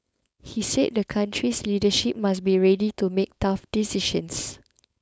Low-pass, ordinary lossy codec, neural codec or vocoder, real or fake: none; none; codec, 16 kHz, 4.8 kbps, FACodec; fake